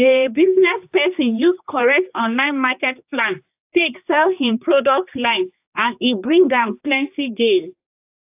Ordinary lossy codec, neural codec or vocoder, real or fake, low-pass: AAC, 32 kbps; codec, 16 kHz, 2 kbps, X-Codec, HuBERT features, trained on general audio; fake; 3.6 kHz